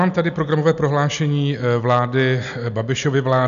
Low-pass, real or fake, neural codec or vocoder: 7.2 kHz; real; none